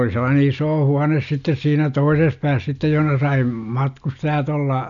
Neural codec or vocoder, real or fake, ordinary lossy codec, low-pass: none; real; none; 7.2 kHz